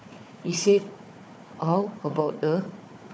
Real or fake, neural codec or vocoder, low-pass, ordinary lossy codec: fake; codec, 16 kHz, 4 kbps, FunCodec, trained on Chinese and English, 50 frames a second; none; none